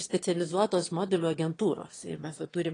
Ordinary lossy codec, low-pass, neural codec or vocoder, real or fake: AAC, 32 kbps; 9.9 kHz; autoencoder, 22.05 kHz, a latent of 192 numbers a frame, VITS, trained on one speaker; fake